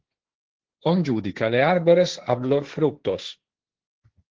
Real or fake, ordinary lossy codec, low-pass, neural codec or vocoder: fake; Opus, 16 kbps; 7.2 kHz; codec, 16 kHz, 1.1 kbps, Voila-Tokenizer